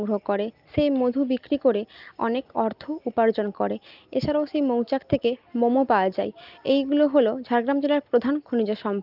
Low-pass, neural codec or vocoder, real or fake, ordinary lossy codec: 5.4 kHz; none; real; Opus, 24 kbps